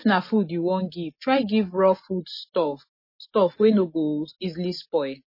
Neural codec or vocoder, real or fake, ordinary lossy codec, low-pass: none; real; MP3, 24 kbps; 5.4 kHz